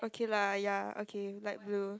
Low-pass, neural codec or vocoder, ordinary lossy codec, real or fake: none; none; none; real